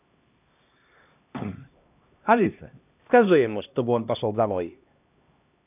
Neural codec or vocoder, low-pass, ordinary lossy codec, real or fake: codec, 16 kHz, 1 kbps, X-Codec, HuBERT features, trained on LibriSpeech; 3.6 kHz; none; fake